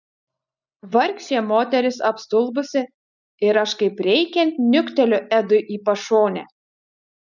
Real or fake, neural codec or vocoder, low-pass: real; none; 7.2 kHz